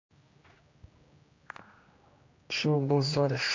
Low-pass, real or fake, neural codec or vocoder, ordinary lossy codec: 7.2 kHz; fake; codec, 16 kHz, 1 kbps, X-Codec, HuBERT features, trained on general audio; MP3, 48 kbps